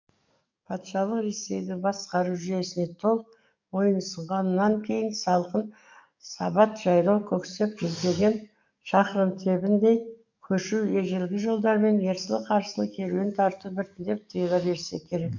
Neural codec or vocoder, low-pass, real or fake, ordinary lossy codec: codec, 44.1 kHz, 7.8 kbps, DAC; 7.2 kHz; fake; MP3, 64 kbps